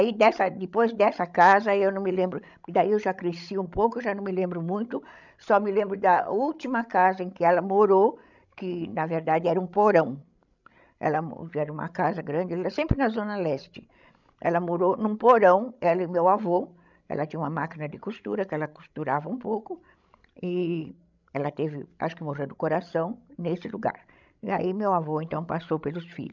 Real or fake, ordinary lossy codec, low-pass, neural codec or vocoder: fake; none; 7.2 kHz; codec, 16 kHz, 16 kbps, FreqCodec, larger model